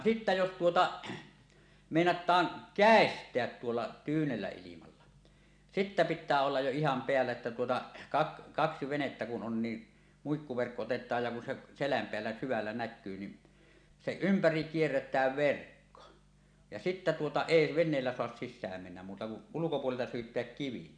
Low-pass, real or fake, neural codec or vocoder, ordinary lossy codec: 9.9 kHz; real; none; none